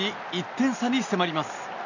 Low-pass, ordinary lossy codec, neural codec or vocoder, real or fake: 7.2 kHz; none; none; real